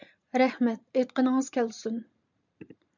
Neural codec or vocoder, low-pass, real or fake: vocoder, 44.1 kHz, 128 mel bands every 512 samples, BigVGAN v2; 7.2 kHz; fake